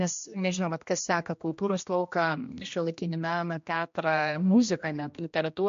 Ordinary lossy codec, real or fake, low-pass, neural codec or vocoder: MP3, 48 kbps; fake; 7.2 kHz; codec, 16 kHz, 1 kbps, X-Codec, HuBERT features, trained on general audio